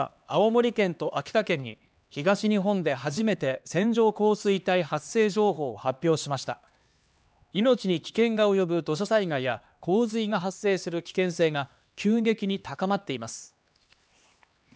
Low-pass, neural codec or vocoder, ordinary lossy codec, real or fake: none; codec, 16 kHz, 2 kbps, X-Codec, HuBERT features, trained on LibriSpeech; none; fake